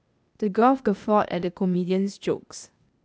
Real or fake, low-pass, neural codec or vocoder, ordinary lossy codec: fake; none; codec, 16 kHz, 0.8 kbps, ZipCodec; none